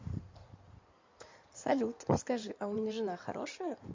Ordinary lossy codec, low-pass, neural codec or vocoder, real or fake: MP3, 48 kbps; 7.2 kHz; codec, 16 kHz in and 24 kHz out, 2.2 kbps, FireRedTTS-2 codec; fake